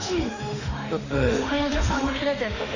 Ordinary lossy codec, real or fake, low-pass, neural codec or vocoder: none; fake; 7.2 kHz; autoencoder, 48 kHz, 32 numbers a frame, DAC-VAE, trained on Japanese speech